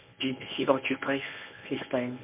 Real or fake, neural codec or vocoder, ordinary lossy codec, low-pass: fake; codec, 24 kHz, 0.9 kbps, WavTokenizer, medium speech release version 1; MP3, 32 kbps; 3.6 kHz